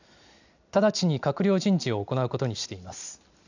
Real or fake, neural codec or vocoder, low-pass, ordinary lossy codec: real; none; 7.2 kHz; none